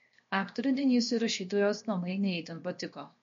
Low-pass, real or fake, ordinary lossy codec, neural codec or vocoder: 7.2 kHz; fake; MP3, 48 kbps; codec, 16 kHz, 0.7 kbps, FocalCodec